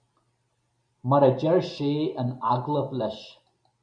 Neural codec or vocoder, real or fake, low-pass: none; real; 9.9 kHz